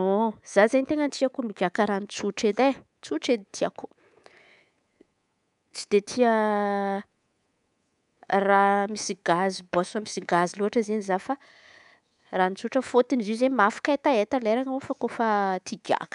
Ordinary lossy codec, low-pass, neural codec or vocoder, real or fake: none; 10.8 kHz; codec, 24 kHz, 3.1 kbps, DualCodec; fake